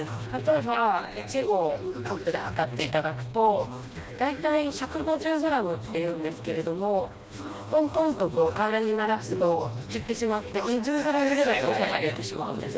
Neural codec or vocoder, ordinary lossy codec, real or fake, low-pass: codec, 16 kHz, 1 kbps, FreqCodec, smaller model; none; fake; none